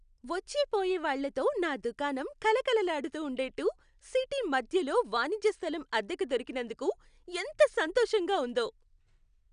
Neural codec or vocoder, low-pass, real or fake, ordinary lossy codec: none; 9.9 kHz; real; none